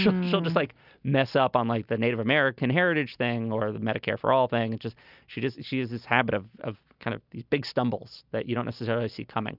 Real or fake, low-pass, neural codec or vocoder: real; 5.4 kHz; none